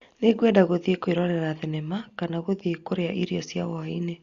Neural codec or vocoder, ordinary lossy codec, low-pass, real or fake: none; Opus, 64 kbps; 7.2 kHz; real